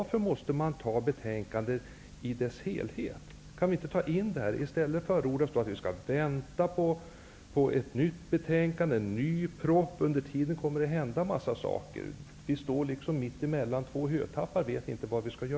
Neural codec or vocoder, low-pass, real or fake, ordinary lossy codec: none; none; real; none